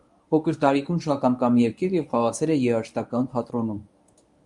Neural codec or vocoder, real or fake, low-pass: codec, 24 kHz, 0.9 kbps, WavTokenizer, medium speech release version 1; fake; 10.8 kHz